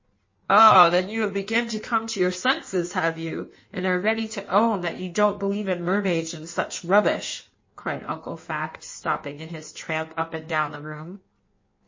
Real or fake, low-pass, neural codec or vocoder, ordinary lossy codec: fake; 7.2 kHz; codec, 16 kHz in and 24 kHz out, 1.1 kbps, FireRedTTS-2 codec; MP3, 32 kbps